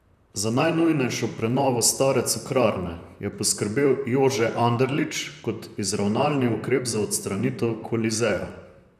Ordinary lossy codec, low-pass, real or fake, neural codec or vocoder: none; 14.4 kHz; fake; vocoder, 44.1 kHz, 128 mel bands, Pupu-Vocoder